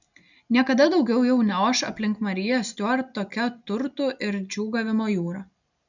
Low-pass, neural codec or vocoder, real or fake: 7.2 kHz; none; real